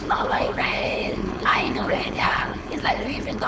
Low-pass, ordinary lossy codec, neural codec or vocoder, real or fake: none; none; codec, 16 kHz, 4.8 kbps, FACodec; fake